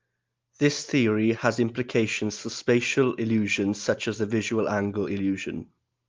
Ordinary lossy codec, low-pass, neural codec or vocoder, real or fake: Opus, 32 kbps; 7.2 kHz; none; real